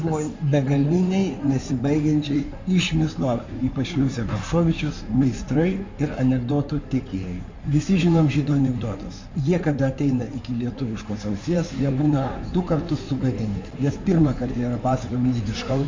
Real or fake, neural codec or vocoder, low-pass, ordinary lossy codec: fake; codec, 16 kHz in and 24 kHz out, 2.2 kbps, FireRedTTS-2 codec; 7.2 kHz; MP3, 64 kbps